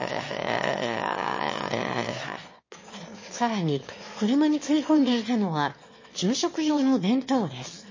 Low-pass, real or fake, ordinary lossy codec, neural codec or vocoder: 7.2 kHz; fake; MP3, 32 kbps; autoencoder, 22.05 kHz, a latent of 192 numbers a frame, VITS, trained on one speaker